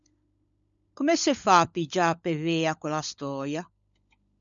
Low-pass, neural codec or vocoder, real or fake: 7.2 kHz; codec, 16 kHz, 16 kbps, FunCodec, trained on LibriTTS, 50 frames a second; fake